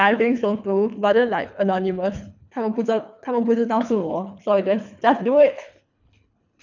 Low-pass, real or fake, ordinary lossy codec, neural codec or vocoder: 7.2 kHz; fake; none; codec, 24 kHz, 3 kbps, HILCodec